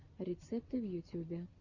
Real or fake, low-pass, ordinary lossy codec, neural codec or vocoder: real; 7.2 kHz; Opus, 64 kbps; none